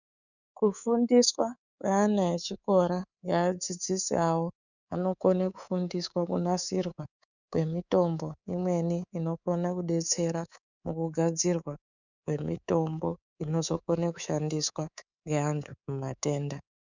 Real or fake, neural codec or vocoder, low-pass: fake; codec, 24 kHz, 3.1 kbps, DualCodec; 7.2 kHz